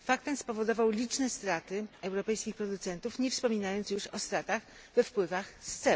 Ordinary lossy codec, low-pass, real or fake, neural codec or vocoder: none; none; real; none